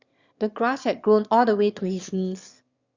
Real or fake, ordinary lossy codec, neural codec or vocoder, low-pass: fake; Opus, 64 kbps; autoencoder, 22.05 kHz, a latent of 192 numbers a frame, VITS, trained on one speaker; 7.2 kHz